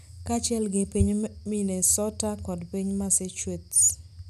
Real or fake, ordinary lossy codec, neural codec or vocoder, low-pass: real; none; none; 14.4 kHz